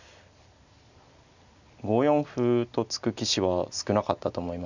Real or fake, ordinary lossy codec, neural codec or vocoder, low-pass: real; none; none; 7.2 kHz